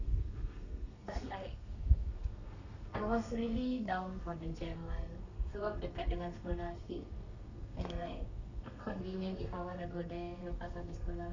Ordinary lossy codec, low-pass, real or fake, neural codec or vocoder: none; 7.2 kHz; fake; codec, 32 kHz, 1.9 kbps, SNAC